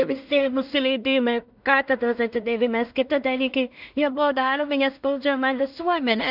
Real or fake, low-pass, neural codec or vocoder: fake; 5.4 kHz; codec, 16 kHz in and 24 kHz out, 0.4 kbps, LongCat-Audio-Codec, two codebook decoder